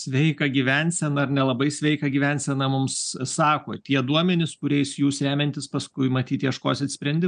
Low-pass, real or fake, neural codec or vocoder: 9.9 kHz; real; none